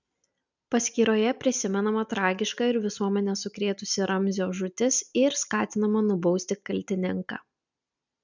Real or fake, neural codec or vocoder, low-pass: real; none; 7.2 kHz